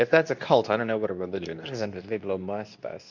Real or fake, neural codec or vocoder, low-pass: fake; codec, 24 kHz, 0.9 kbps, WavTokenizer, medium speech release version 2; 7.2 kHz